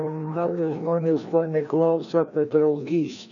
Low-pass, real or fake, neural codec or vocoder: 7.2 kHz; fake; codec, 16 kHz, 1 kbps, FreqCodec, larger model